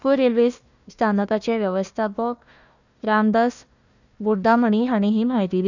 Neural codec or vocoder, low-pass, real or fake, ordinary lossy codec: codec, 16 kHz, 1 kbps, FunCodec, trained on Chinese and English, 50 frames a second; 7.2 kHz; fake; none